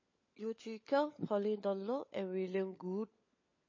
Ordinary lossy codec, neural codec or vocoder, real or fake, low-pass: MP3, 32 kbps; codec, 16 kHz, 16 kbps, FunCodec, trained on LibriTTS, 50 frames a second; fake; 7.2 kHz